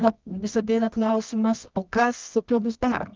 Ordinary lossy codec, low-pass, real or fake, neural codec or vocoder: Opus, 32 kbps; 7.2 kHz; fake; codec, 24 kHz, 0.9 kbps, WavTokenizer, medium music audio release